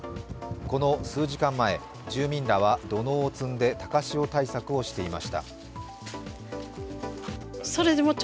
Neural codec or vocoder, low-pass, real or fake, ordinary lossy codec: none; none; real; none